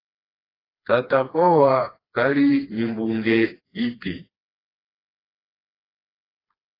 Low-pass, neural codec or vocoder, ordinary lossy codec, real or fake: 5.4 kHz; codec, 16 kHz, 2 kbps, FreqCodec, smaller model; AAC, 24 kbps; fake